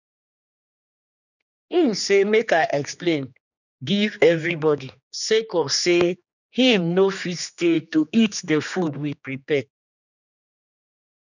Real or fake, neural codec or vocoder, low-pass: fake; codec, 16 kHz, 2 kbps, X-Codec, HuBERT features, trained on general audio; 7.2 kHz